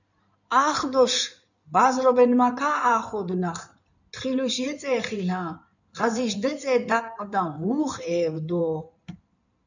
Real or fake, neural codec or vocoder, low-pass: fake; codec, 16 kHz in and 24 kHz out, 2.2 kbps, FireRedTTS-2 codec; 7.2 kHz